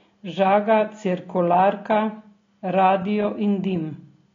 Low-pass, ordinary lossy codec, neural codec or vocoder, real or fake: 7.2 kHz; AAC, 32 kbps; none; real